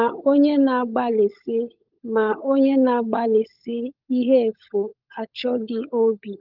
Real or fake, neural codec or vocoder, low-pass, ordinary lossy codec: fake; codec, 16 kHz, 16 kbps, FunCodec, trained on LibriTTS, 50 frames a second; 5.4 kHz; Opus, 24 kbps